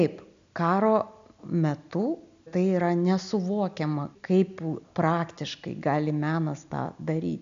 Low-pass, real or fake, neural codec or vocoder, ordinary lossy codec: 7.2 kHz; real; none; MP3, 64 kbps